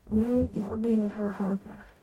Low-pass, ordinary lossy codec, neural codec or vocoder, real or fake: 19.8 kHz; MP3, 64 kbps; codec, 44.1 kHz, 0.9 kbps, DAC; fake